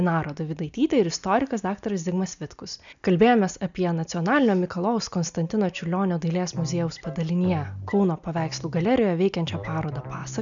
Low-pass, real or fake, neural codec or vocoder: 7.2 kHz; real; none